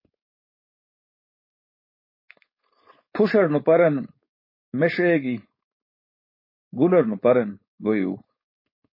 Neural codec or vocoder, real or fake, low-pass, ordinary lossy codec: codec, 16 kHz, 4.8 kbps, FACodec; fake; 5.4 kHz; MP3, 24 kbps